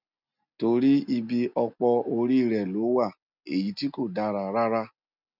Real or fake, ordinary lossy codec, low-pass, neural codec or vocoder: real; none; 5.4 kHz; none